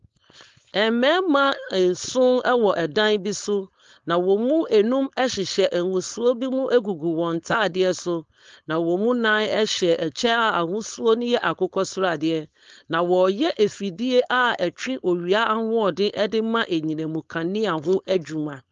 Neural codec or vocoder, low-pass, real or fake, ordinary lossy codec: codec, 16 kHz, 4.8 kbps, FACodec; 7.2 kHz; fake; Opus, 32 kbps